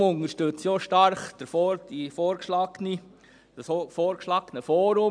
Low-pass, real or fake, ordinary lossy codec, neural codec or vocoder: 9.9 kHz; real; none; none